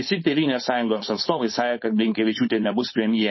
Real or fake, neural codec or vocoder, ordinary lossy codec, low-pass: fake; codec, 16 kHz, 4.8 kbps, FACodec; MP3, 24 kbps; 7.2 kHz